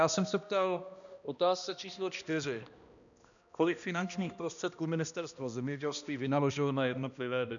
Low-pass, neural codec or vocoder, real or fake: 7.2 kHz; codec, 16 kHz, 1 kbps, X-Codec, HuBERT features, trained on balanced general audio; fake